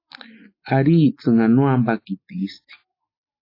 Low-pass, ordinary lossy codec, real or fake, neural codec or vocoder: 5.4 kHz; AAC, 32 kbps; real; none